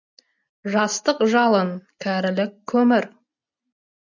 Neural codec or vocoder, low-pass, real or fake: none; 7.2 kHz; real